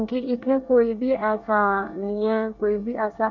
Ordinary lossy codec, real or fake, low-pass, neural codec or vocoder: none; fake; 7.2 kHz; codec, 24 kHz, 1 kbps, SNAC